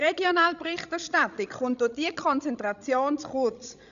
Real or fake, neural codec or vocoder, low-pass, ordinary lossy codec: fake; codec, 16 kHz, 16 kbps, FreqCodec, larger model; 7.2 kHz; none